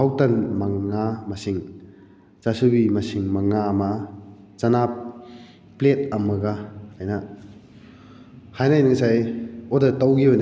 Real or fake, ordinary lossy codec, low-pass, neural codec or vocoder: real; none; none; none